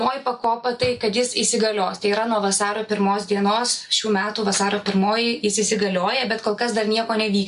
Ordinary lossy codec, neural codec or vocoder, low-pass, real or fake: MP3, 64 kbps; none; 10.8 kHz; real